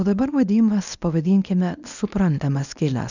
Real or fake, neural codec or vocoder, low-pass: fake; codec, 24 kHz, 0.9 kbps, WavTokenizer, medium speech release version 1; 7.2 kHz